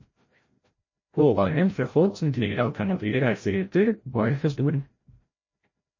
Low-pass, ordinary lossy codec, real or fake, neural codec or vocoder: 7.2 kHz; MP3, 32 kbps; fake; codec, 16 kHz, 0.5 kbps, FreqCodec, larger model